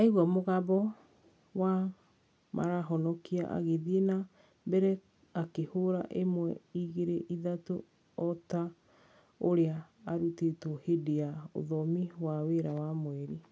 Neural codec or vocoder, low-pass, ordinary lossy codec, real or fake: none; none; none; real